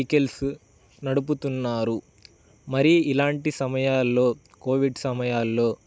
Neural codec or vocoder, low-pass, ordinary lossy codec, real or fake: none; none; none; real